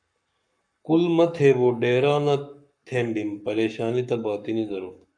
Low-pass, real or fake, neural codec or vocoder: 9.9 kHz; fake; codec, 44.1 kHz, 7.8 kbps, Pupu-Codec